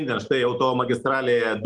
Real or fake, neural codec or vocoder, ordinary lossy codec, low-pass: real; none; Opus, 24 kbps; 10.8 kHz